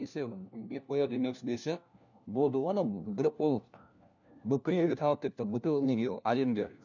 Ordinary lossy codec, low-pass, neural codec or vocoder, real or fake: none; 7.2 kHz; codec, 16 kHz, 1 kbps, FunCodec, trained on LibriTTS, 50 frames a second; fake